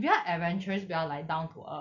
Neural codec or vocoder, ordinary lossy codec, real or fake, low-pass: none; none; real; 7.2 kHz